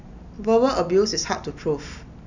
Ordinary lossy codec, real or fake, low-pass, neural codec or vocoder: none; real; 7.2 kHz; none